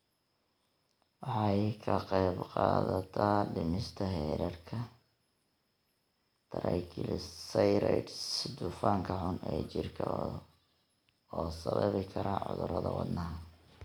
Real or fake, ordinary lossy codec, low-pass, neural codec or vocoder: real; none; none; none